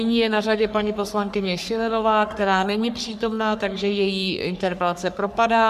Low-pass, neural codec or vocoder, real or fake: 14.4 kHz; codec, 44.1 kHz, 3.4 kbps, Pupu-Codec; fake